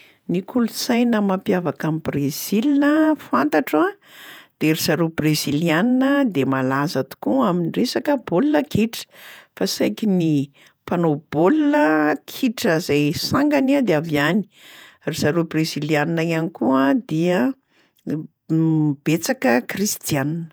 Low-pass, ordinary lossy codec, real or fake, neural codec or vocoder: none; none; fake; vocoder, 48 kHz, 128 mel bands, Vocos